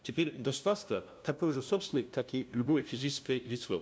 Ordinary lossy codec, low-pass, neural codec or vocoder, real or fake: none; none; codec, 16 kHz, 0.5 kbps, FunCodec, trained on LibriTTS, 25 frames a second; fake